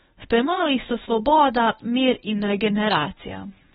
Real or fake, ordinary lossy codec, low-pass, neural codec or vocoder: fake; AAC, 16 kbps; 10.8 kHz; codec, 24 kHz, 0.9 kbps, WavTokenizer, medium speech release version 1